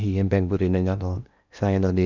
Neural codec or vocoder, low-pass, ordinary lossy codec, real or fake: codec, 16 kHz in and 24 kHz out, 0.8 kbps, FocalCodec, streaming, 65536 codes; 7.2 kHz; none; fake